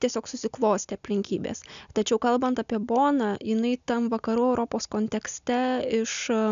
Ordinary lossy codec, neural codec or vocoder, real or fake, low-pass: AAC, 96 kbps; none; real; 7.2 kHz